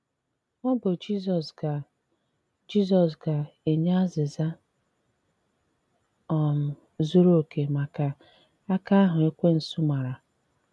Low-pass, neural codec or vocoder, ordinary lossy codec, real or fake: none; none; none; real